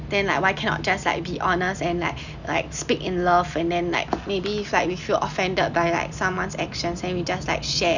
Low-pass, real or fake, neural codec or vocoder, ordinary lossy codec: 7.2 kHz; real; none; none